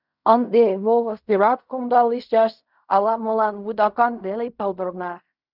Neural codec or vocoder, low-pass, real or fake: codec, 16 kHz in and 24 kHz out, 0.4 kbps, LongCat-Audio-Codec, fine tuned four codebook decoder; 5.4 kHz; fake